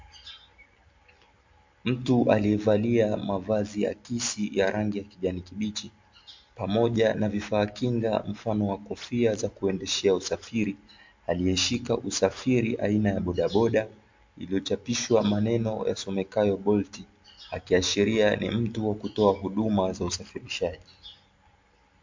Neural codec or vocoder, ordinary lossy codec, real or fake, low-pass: none; MP3, 48 kbps; real; 7.2 kHz